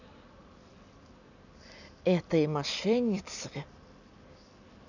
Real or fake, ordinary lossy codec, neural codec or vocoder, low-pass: real; none; none; 7.2 kHz